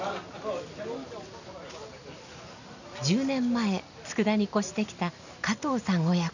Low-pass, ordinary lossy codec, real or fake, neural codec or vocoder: 7.2 kHz; none; real; none